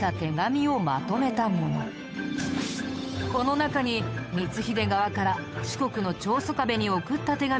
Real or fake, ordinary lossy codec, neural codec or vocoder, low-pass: fake; none; codec, 16 kHz, 8 kbps, FunCodec, trained on Chinese and English, 25 frames a second; none